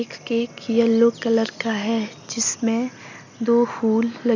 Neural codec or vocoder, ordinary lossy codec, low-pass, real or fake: none; none; 7.2 kHz; real